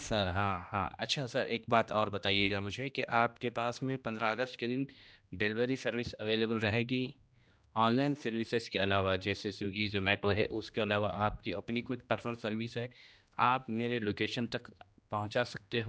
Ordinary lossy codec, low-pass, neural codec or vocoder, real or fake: none; none; codec, 16 kHz, 1 kbps, X-Codec, HuBERT features, trained on general audio; fake